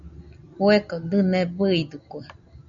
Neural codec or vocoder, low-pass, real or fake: none; 7.2 kHz; real